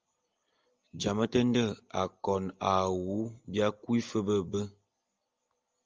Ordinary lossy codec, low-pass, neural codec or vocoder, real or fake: Opus, 24 kbps; 7.2 kHz; none; real